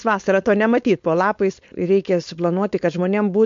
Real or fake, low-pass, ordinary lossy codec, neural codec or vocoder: fake; 7.2 kHz; MP3, 48 kbps; codec, 16 kHz, 4.8 kbps, FACodec